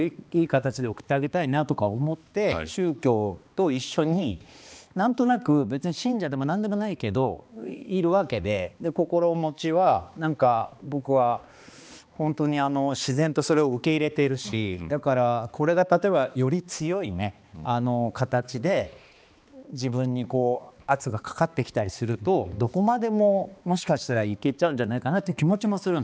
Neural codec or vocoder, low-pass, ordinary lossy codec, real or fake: codec, 16 kHz, 2 kbps, X-Codec, HuBERT features, trained on balanced general audio; none; none; fake